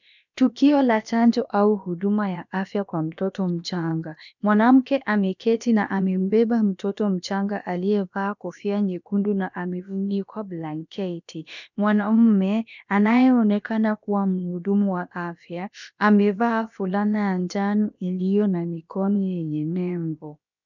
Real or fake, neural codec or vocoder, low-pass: fake; codec, 16 kHz, about 1 kbps, DyCAST, with the encoder's durations; 7.2 kHz